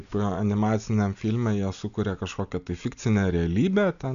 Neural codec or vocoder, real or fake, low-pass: none; real; 7.2 kHz